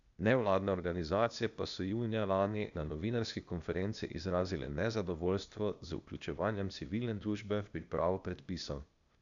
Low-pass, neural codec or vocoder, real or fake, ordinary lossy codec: 7.2 kHz; codec, 16 kHz, 0.8 kbps, ZipCodec; fake; none